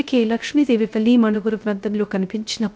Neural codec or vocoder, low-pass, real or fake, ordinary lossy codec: codec, 16 kHz, 0.3 kbps, FocalCodec; none; fake; none